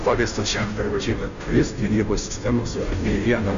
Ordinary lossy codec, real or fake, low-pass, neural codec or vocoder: Opus, 64 kbps; fake; 7.2 kHz; codec, 16 kHz, 0.5 kbps, FunCodec, trained on Chinese and English, 25 frames a second